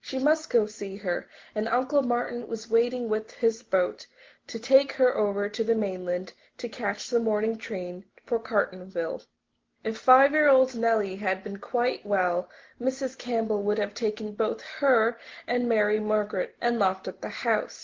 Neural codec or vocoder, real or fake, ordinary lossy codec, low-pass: none; real; Opus, 16 kbps; 7.2 kHz